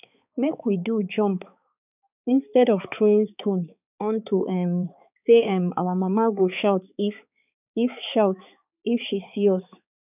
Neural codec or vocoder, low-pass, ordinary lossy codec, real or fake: codec, 16 kHz, 4 kbps, X-Codec, HuBERT features, trained on balanced general audio; 3.6 kHz; none; fake